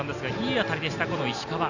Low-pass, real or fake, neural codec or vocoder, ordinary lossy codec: 7.2 kHz; real; none; none